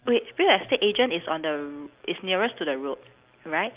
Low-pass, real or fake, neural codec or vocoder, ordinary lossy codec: 3.6 kHz; real; none; Opus, 24 kbps